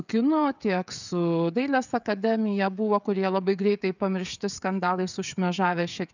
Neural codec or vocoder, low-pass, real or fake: codec, 16 kHz, 16 kbps, FreqCodec, smaller model; 7.2 kHz; fake